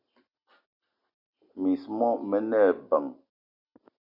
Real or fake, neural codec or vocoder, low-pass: real; none; 5.4 kHz